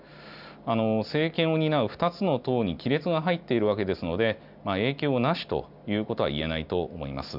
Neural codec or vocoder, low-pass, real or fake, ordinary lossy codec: none; 5.4 kHz; real; none